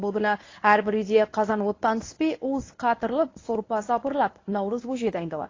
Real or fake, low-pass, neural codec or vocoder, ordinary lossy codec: fake; 7.2 kHz; codec, 24 kHz, 0.9 kbps, WavTokenizer, medium speech release version 1; AAC, 32 kbps